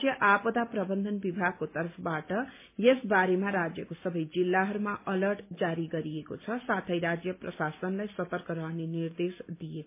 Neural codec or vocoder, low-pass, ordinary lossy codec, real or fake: none; 3.6 kHz; MP3, 24 kbps; real